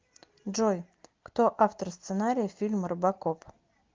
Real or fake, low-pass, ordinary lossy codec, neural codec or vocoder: real; 7.2 kHz; Opus, 32 kbps; none